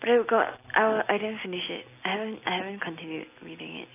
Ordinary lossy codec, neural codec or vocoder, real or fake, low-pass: AAC, 24 kbps; none; real; 3.6 kHz